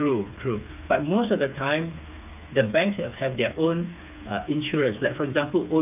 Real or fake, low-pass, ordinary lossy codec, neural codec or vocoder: fake; 3.6 kHz; none; codec, 16 kHz, 4 kbps, FreqCodec, smaller model